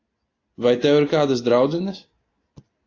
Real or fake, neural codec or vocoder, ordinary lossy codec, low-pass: real; none; AAC, 32 kbps; 7.2 kHz